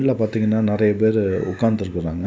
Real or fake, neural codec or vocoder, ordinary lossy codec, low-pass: real; none; none; none